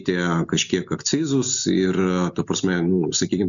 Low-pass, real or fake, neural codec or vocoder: 7.2 kHz; real; none